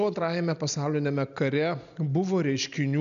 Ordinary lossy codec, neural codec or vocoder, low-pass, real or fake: MP3, 96 kbps; none; 7.2 kHz; real